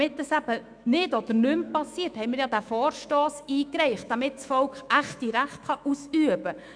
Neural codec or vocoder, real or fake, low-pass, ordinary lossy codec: autoencoder, 48 kHz, 128 numbers a frame, DAC-VAE, trained on Japanese speech; fake; 9.9 kHz; none